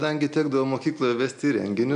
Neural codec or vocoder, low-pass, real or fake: none; 9.9 kHz; real